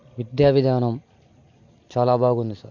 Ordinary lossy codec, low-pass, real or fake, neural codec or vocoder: AAC, 48 kbps; 7.2 kHz; real; none